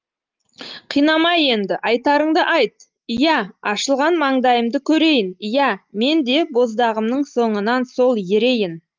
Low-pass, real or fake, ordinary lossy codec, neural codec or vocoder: 7.2 kHz; real; Opus, 32 kbps; none